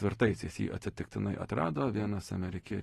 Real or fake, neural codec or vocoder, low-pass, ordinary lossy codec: real; none; 19.8 kHz; AAC, 32 kbps